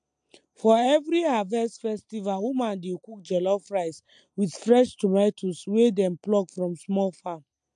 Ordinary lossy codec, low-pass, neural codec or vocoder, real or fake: MP3, 64 kbps; 9.9 kHz; none; real